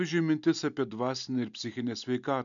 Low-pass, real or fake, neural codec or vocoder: 7.2 kHz; real; none